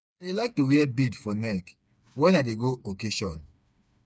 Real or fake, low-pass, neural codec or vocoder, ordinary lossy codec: fake; none; codec, 16 kHz, 4 kbps, FreqCodec, smaller model; none